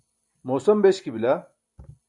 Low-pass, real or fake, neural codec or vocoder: 10.8 kHz; real; none